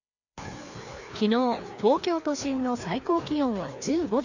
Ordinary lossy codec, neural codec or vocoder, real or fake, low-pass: none; codec, 16 kHz, 2 kbps, FreqCodec, larger model; fake; 7.2 kHz